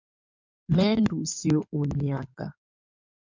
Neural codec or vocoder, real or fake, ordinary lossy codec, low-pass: codec, 16 kHz in and 24 kHz out, 2.2 kbps, FireRedTTS-2 codec; fake; MP3, 64 kbps; 7.2 kHz